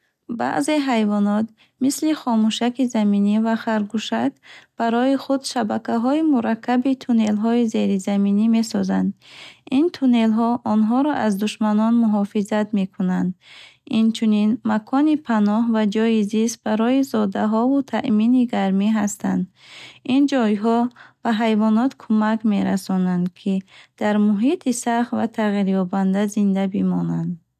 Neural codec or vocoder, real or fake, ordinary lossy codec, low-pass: none; real; none; 14.4 kHz